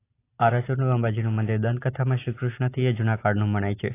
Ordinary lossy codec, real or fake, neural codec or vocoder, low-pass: AAC, 24 kbps; real; none; 3.6 kHz